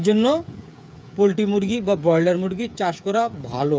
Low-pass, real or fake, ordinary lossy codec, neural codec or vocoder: none; fake; none; codec, 16 kHz, 8 kbps, FreqCodec, smaller model